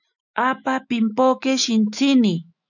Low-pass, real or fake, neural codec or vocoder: 7.2 kHz; fake; autoencoder, 48 kHz, 128 numbers a frame, DAC-VAE, trained on Japanese speech